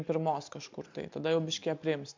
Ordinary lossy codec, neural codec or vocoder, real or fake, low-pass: MP3, 64 kbps; none; real; 7.2 kHz